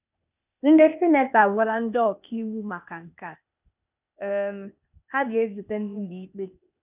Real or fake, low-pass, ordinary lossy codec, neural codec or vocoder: fake; 3.6 kHz; none; codec, 16 kHz, 0.8 kbps, ZipCodec